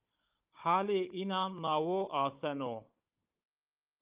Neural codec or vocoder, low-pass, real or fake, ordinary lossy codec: codec, 16 kHz, 16 kbps, FunCodec, trained on Chinese and English, 50 frames a second; 3.6 kHz; fake; Opus, 32 kbps